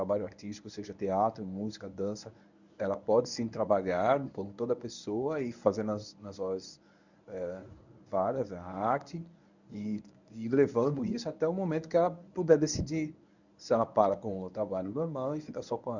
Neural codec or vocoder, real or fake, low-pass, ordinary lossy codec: codec, 24 kHz, 0.9 kbps, WavTokenizer, medium speech release version 1; fake; 7.2 kHz; none